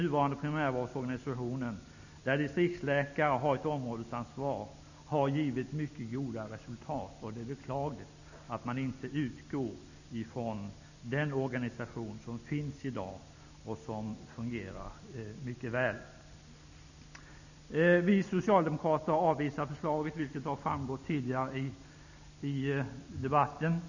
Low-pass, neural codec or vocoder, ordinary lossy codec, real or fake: 7.2 kHz; none; none; real